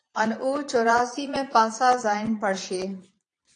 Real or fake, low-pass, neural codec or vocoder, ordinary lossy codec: fake; 9.9 kHz; vocoder, 22.05 kHz, 80 mel bands, Vocos; AAC, 48 kbps